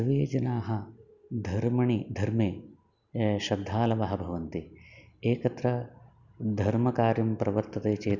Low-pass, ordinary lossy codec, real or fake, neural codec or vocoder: 7.2 kHz; none; real; none